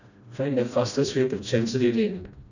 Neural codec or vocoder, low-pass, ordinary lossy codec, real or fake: codec, 16 kHz, 1 kbps, FreqCodec, smaller model; 7.2 kHz; none; fake